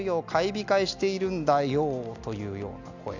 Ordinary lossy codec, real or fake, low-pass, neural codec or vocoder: none; real; 7.2 kHz; none